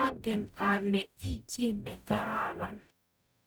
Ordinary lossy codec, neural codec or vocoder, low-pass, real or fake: none; codec, 44.1 kHz, 0.9 kbps, DAC; none; fake